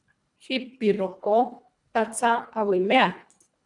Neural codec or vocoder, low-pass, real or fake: codec, 24 kHz, 1.5 kbps, HILCodec; 10.8 kHz; fake